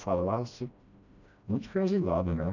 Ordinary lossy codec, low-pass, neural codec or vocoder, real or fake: none; 7.2 kHz; codec, 16 kHz, 1 kbps, FreqCodec, smaller model; fake